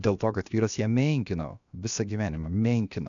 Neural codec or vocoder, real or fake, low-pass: codec, 16 kHz, about 1 kbps, DyCAST, with the encoder's durations; fake; 7.2 kHz